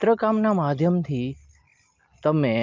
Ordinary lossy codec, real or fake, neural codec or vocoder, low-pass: Opus, 24 kbps; real; none; 7.2 kHz